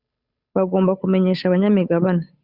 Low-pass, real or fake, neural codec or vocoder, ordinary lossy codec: 5.4 kHz; fake; codec, 16 kHz, 8 kbps, FunCodec, trained on Chinese and English, 25 frames a second; Opus, 64 kbps